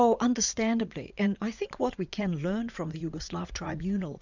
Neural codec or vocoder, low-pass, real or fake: vocoder, 44.1 kHz, 80 mel bands, Vocos; 7.2 kHz; fake